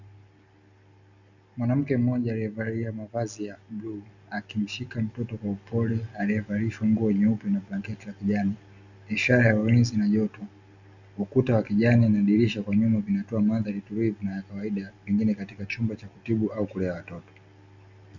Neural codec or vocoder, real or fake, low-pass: none; real; 7.2 kHz